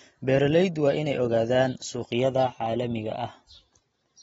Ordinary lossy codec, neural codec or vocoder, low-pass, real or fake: AAC, 24 kbps; none; 19.8 kHz; real